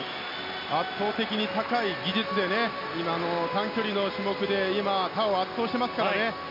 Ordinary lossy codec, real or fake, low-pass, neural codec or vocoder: MP3, 32 kbps; real; 5.4 kHz; none